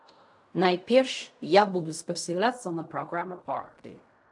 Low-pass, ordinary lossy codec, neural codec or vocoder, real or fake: 10.8 kHz; AAC, 64 kbps; codec, 16 kHz in and 24 kHz out, 0.4 kbps, LongCat-Audio-Codec, fine tuned four codebook decoder; fake